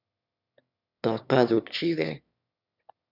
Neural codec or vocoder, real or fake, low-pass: autoencoder, 22.05 kHz, a latent of 192 numbers a frame, VITS, trained on one speaker; fake; 5.4 kHz